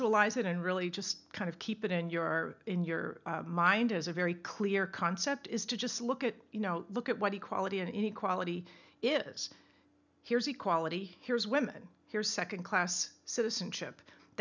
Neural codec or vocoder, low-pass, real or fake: none; 7.2 kHz; real